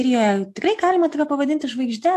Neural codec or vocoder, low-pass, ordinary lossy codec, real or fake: none; 14.4 kHz; AAC, 64 kbps; real